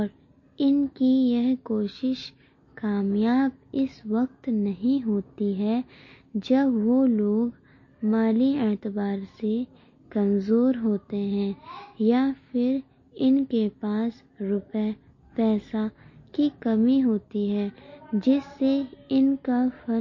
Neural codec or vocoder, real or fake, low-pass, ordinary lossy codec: none; real; 7.2 kHz; MP3, 32 kbps